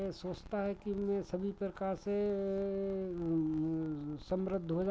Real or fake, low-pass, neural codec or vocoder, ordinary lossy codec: real; none; none; none